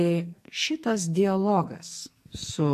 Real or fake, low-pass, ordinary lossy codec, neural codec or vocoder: fake; 14.4 kHz; MP3, 64 kbps; codec, 44.1 kHz, 2.6 kbps, SNAC